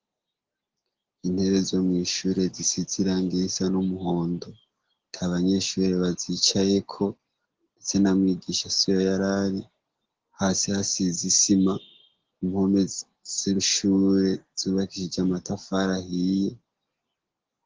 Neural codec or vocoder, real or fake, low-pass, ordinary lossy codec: none; real; 7.2 kHz; Opus, 16 kbps